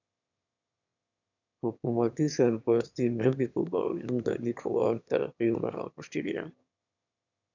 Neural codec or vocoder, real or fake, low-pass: autoencoder, 22.05 kHz, a latent of 192 numbers a frame, VITS, trained on one speaker; fake; 7.2 kHz